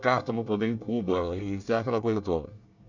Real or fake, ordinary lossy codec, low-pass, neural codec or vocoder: fake; none; 7.2 kHz; codec, 24 kHz, 1 kbps, SNAC